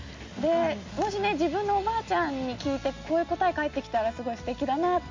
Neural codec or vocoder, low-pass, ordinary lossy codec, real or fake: none; 7.2 kHz; MP3, 48 kbps; real